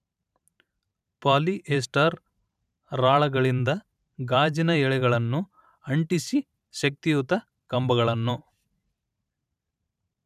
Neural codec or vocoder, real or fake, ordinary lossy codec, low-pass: vocoder, 44.1 kHz, 128 mel bands every 512 samples, BigVGAN v2; fake; none; 14.4 kHz